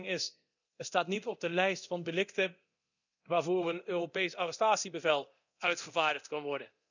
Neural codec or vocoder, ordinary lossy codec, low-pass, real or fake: codec, 24 kHz, 0.9 kbps, DualCodec; none; 7.2 kHz; fake